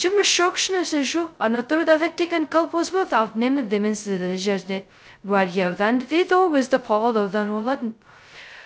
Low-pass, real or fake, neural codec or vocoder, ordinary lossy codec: none; fake; codec, 16 kHz, 0.2 kbps, FocalCodec; none